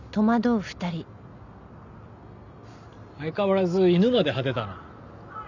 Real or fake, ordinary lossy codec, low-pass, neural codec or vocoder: real; none; 7.2 kHz; none